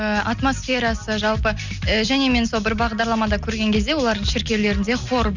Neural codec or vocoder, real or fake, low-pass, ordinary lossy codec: none; real; 7.2 kHz; none